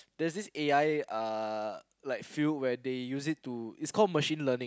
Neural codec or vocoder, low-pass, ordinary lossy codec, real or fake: none; none; none; real